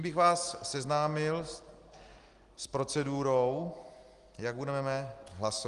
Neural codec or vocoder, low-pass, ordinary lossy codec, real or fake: none; 10.8 kHz; Opus, 24 kbps; real